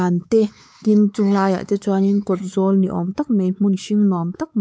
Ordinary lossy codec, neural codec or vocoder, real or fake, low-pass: none; codec, 16 kHz, 4 kbps, X-Codec, WavLM features, trained on Multilingual LibriSpeech; fake; none